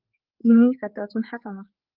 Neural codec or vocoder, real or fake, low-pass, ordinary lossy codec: codec, 16 kHz, 4 kbps, X-Codec, HuBERT features, trained on general audio; fake; 5.4 kHz; Opus, 24 kbps